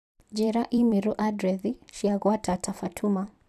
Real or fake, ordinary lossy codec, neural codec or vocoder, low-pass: fake; none; vocoder, 44.1 kHz, 128 mel bands every 256 samples, BigVGAN v2; 14.4 kHz